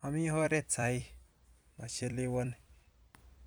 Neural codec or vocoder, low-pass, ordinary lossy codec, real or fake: vocoder, 44.1 kHz, 128 mel bands every 256 samples, BigVGAN v2; none; none; fake